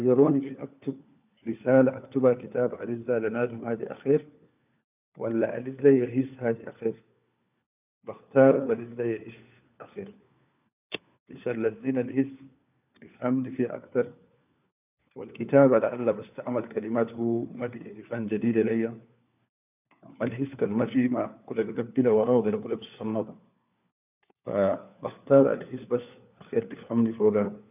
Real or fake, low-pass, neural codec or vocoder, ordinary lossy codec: fake; 3.6 kHz; codec, 16 kHz, 4 kbps, FunCodec, trained on LibriTTS, 50 frames a second; none